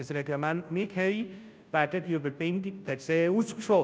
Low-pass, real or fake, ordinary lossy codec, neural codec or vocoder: none; fake; none; codec, 16 kHz, 0.5 kbps, FunCodec, trained on Chinese and English, 25 frames a second